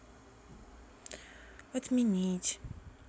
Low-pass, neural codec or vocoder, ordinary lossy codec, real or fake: none; none; none; real